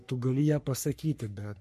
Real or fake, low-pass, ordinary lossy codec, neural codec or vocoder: fake; 14.4 kHz; MP3, 64 kbps; codec, 44.1 kHz, 3.4 kbps, Pupu-Codec